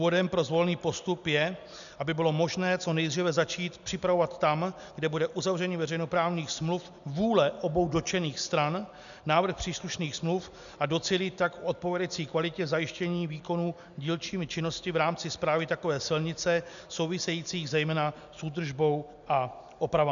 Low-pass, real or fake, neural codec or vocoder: 7.2 kHz; real; none